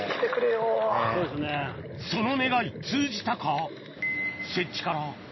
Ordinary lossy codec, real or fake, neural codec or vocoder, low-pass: MP3, 24 kbps; real; none; 7.2 kHz